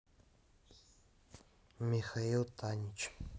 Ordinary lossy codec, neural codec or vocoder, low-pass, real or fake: none; none; none; real